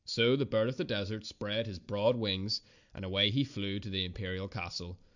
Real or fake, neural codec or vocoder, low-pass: real; none; 7.2 kHz